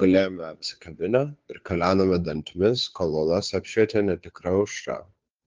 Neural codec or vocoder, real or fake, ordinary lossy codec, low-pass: codec, 16 kHz, 2 kbps, FunCodec, trained on Chinese and English, 25 frames a second; fake; Opus, 32 kbps; 7.2 kHz